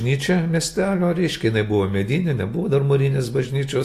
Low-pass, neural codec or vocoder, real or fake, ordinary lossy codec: 14.4 kHz; none; real; AAC, 48 kbps